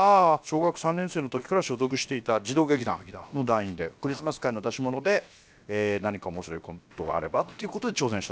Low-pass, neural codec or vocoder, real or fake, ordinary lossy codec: none; codec, 16 kHz, about 1 kbps, DyCAST, with the encoder's durations; fake; none